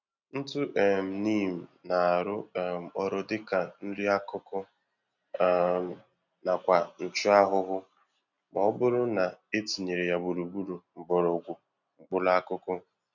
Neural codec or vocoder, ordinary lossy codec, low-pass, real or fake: none; none; 7.2 kHz; real